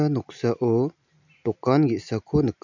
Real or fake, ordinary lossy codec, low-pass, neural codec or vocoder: real; none; 7.2 kHz; none